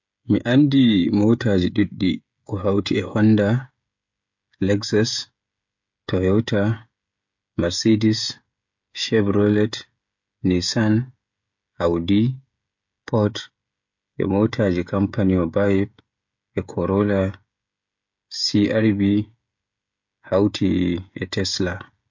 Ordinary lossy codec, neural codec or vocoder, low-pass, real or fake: MP3, 48 kbps; codec, 16 kHz, 16 kbps, FreqCodec, smaller model; 7.2 kHz; fake